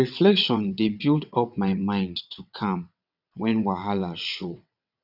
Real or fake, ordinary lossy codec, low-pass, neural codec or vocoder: fake; none; 5.4 kHz; vocoder, 22.05 kHz, 80 mel bands, WaveNeXt